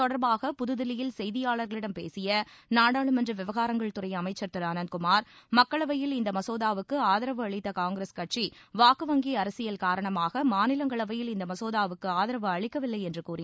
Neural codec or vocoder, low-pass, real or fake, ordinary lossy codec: none; none; real; none